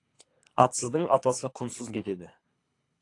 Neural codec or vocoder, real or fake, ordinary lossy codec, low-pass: codec, 24 kHz, 3 kbps, HILCodec; fake; AAC, 48 kbps; 10.8 kHz